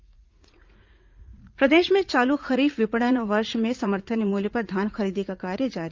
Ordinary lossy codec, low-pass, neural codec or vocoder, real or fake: Opus, 24 kbps; 7.2 kHz; vocoder, 44.1 kHz, 80 mel bands, Vocos; fake